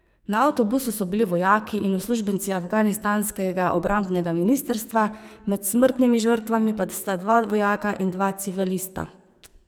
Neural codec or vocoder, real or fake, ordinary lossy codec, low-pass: codec, 44.1 kHz, 2.6 kbps, SNAC; fake; none; none